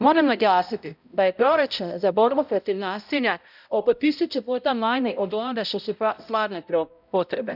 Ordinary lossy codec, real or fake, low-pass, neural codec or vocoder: none; fake; 5.4 kHz; codec, 16 kHz, 0.5 kbps, X-Codec, HuBERT features, trained on balanced general audio